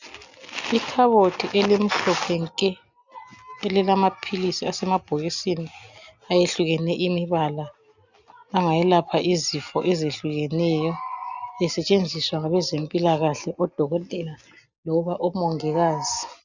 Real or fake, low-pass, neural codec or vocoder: real; 7.2 kHz; none